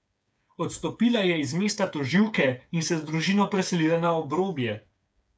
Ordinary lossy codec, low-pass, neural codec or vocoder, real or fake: none; none; codec, 16 kHz, 8 kbps, FreqCodec, smaller model; fake